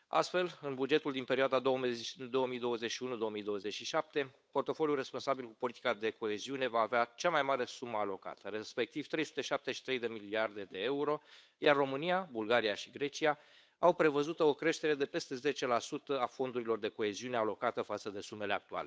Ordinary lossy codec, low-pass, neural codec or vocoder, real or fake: none; none; codec, 16 kHz, 8 kbps, FunCodec, trained on Chinese and English, 25 frames a second; fake